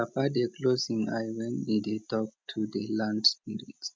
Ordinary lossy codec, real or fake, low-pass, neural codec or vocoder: none; real; none; none